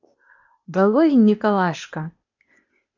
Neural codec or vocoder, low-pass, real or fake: codec, 16 kHz, 0.8 kbps, ZipCodec; 7.2 kHz; fake